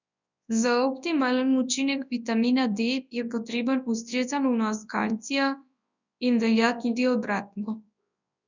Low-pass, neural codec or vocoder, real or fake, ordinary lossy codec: 7.2 kHz; codec, 24 kHz, 0.9 kbps, WavTokenizer, large speech release; fake; none